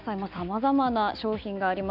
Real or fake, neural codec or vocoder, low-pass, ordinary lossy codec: real; none; 5.4 kHz; none